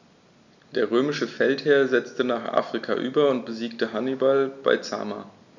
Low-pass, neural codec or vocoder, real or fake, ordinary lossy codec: 7.2 kHz; none; real; none